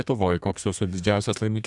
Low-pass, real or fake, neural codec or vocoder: 10.8 kHz; fake; codec, 32 kHz, 1.9 kbps, SNAC